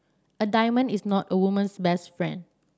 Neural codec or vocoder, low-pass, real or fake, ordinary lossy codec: none; none; real; none